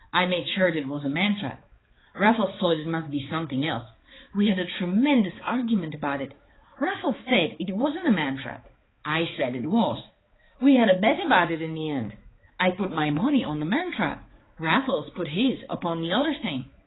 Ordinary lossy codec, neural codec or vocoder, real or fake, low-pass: AAC, 16 kbps; codec, 16 kHz, 4 kbps, X-Codec, HuBERT features, trained on balanced general audio; fake; 7.2 kHz